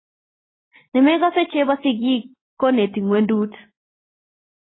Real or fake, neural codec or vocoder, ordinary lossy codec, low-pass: real; none; AAC, 16 kbps; 7.2 kHz